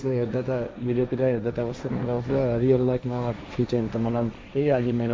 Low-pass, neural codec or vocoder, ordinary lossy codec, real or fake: none; codec, 16 kHz, 1.1 kbps, Voila-Tokenizer; none; fake